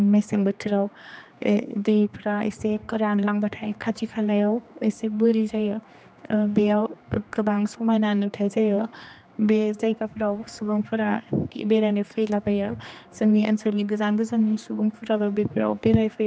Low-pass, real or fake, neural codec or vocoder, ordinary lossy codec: none; fake; codec, 16 kHz, 2 kbps, X-Codec, HuBERT features, trained on general audio; none